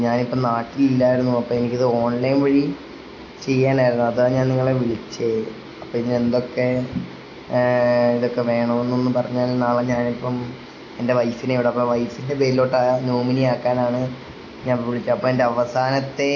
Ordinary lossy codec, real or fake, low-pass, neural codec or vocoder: AAC, 32 kbps; real; 7.2 kHz; none